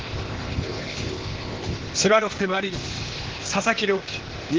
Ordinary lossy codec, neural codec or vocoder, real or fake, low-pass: Opus, 16 kbps; codec, 16 kHz, 0.8 kbps, ZipCodec; fake; 7.2 kHz